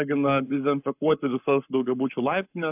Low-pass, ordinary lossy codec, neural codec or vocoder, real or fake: 3.6 kHz; AAC, 32 kbps; codec, 44.1 kHz, 7.8 kbps, Pupu-Codec; fake